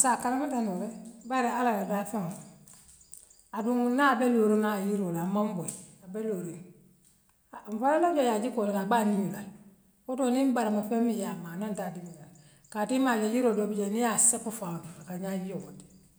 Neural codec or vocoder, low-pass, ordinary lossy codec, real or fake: none; none; none; real